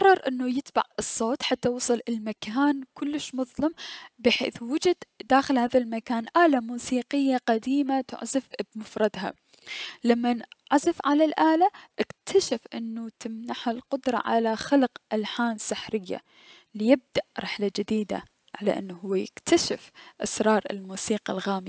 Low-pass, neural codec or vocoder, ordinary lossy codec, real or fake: none; none; none; real